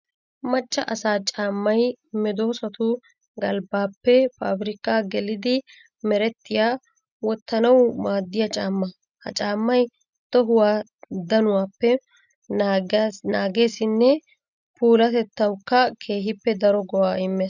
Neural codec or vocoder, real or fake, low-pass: none; real; 7.2 kHz